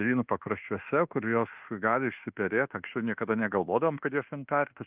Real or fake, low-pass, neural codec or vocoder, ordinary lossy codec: fake; 3.6 kHz; codec, 24 kHz, 1.2 kbps, DualCodec; Opus, 32 kbps